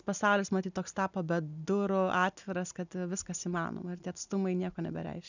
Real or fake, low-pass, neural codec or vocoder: real; 7.2 kHz; none